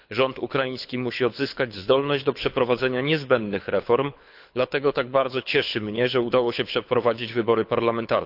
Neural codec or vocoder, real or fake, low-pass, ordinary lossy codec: codec, 24 kHz, 6 kbps, HILCodec; fake; 5.4 kHz; AAC, 48 kbps